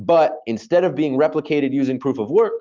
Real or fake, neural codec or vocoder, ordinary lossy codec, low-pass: real; none; Opus, 24 kbps; 7.2 kHz